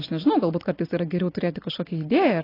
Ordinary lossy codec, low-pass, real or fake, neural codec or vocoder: AAC, 24 kbps; 5.4 kHz; real; none